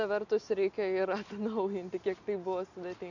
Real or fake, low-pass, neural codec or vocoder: real; 7.2 kHz; none